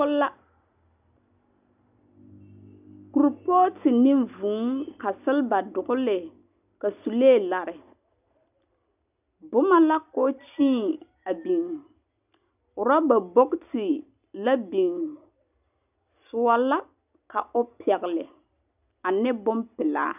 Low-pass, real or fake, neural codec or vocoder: 3.6 kHz; real; none